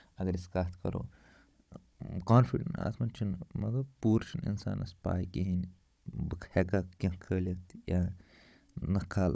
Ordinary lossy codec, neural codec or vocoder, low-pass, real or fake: none; codec, 16 kHz, 16 kbps, FunCodec, trained on Chinese and English, 50 frames a second; none; fake